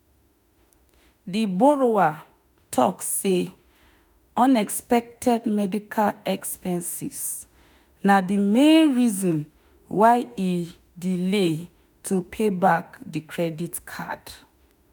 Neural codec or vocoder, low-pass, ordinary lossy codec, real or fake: autoencoder, 48 kHz, 32 numbers a frame, DAC-VAE, trained on Japanese speech; none; none; fake